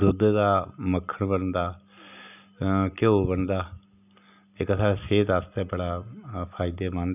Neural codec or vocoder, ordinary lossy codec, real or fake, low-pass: none; none; real; 3.6 kHz